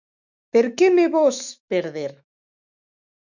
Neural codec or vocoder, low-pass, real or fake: autoencoder, 48 kHz, 128 numbers a frame, DAC-VAE, trained on Japanese speech; 7.2 kHz; fake